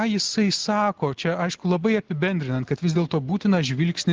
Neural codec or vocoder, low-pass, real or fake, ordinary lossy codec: none; 7.2 kHz; real; Opus, 16 kbps